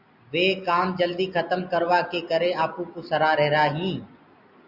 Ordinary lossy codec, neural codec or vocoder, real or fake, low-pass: Opus, 64 kbps; none; real; 5.4 kHz